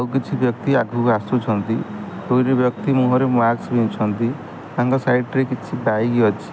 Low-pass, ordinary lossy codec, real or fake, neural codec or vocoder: none; none; real; none